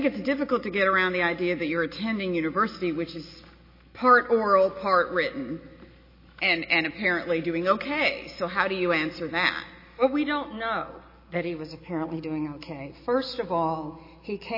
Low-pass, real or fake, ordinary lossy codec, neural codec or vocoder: 5.4 kHz; real; MP3, 24 kbps; none